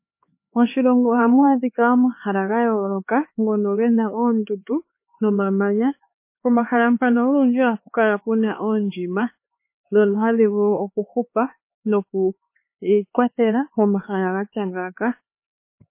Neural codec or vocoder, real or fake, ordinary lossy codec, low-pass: codec, 16 kHz, 4 kbps, X-Codec, HuBERT features, trained on LibriSpeech; fake; MP3, 24 kbps; 3.6 kHz